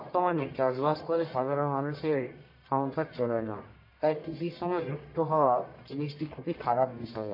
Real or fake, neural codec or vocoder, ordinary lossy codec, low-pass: fake; codec, 44.1 kHz, 1.7 kbps, Pupu-Codec; MP3, 32 kbps; 5.4 kHz